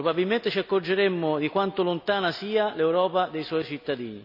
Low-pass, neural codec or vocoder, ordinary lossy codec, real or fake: 5.4 kHz; none; none; real